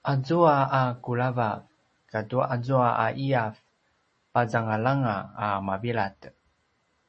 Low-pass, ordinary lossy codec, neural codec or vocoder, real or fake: 10.8 kHz; MP3, 32 kbps; none; real